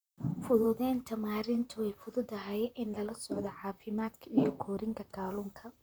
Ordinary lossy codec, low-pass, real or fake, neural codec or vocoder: none; none; fake; vocoder, 44.1 kHz, 128 mel bands, Pupu-Vocoder